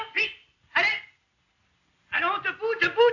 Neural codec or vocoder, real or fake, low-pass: none; real; 7.2 kHz